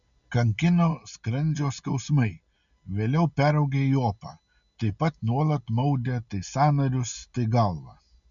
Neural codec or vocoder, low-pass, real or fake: none; 7.2 kHz; real